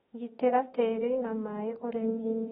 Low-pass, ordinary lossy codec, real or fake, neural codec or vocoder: 19.8 kHz; AAC, 16 kbps; fake; autoencoder, 48 kHz, 32 numbers a frame, DAC-VAE, trained on Japanese speech